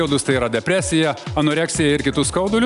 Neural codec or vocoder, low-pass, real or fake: none; 10.8 kHz; real